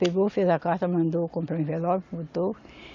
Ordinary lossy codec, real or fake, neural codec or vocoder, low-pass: MP3, 48 kbps; real; none; 7.2 kHz